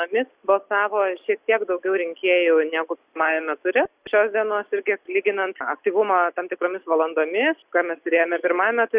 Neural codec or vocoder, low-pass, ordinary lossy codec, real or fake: none; 3.6 kHz; Opus, 64 kbps; real